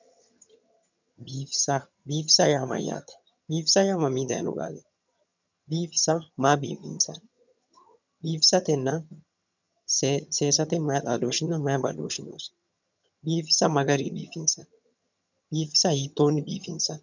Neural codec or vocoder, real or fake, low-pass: vocoder, 22.05 kHz, 80 mel bands, HiFi-GAN; fake; 7.2 kHz